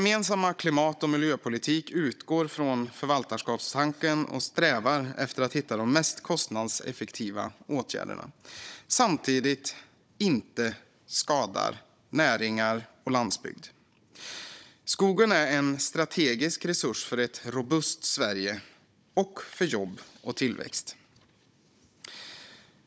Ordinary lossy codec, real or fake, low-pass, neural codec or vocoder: none; fake; none; codec, 16 kHz, 16 kbps, FunCodec, trained on Chinese and English, 50 frames a second